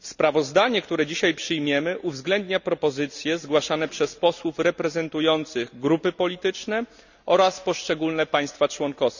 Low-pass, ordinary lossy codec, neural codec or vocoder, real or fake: 7.2 kHz; none; none; real